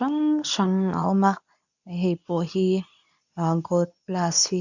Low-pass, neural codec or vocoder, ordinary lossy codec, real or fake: 7.2 kHz; codec, 24 kHz, 0.9 kbps, WavTokenizer, medium speech release version 2; none; fake